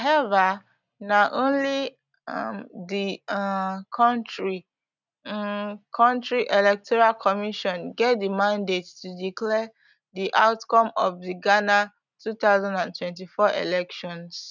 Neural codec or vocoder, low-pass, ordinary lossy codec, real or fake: none; 7.2 kHz; none; real